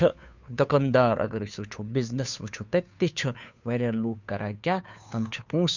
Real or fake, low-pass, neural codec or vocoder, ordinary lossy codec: fake; 7.2 kHz; codec, 16 kHz, 2 kbps, FunCodec, trained on Chinese and English, 25 frames a second; none